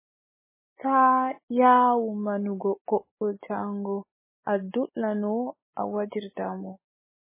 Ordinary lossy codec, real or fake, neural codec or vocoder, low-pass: MP3, 16 kbps; real; none; 3.6 kHz